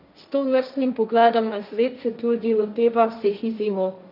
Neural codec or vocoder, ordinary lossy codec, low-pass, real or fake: codec, 16 kHz, 1.1 kbps, Voila-Tokenizer; none; 5.4 kHz; fake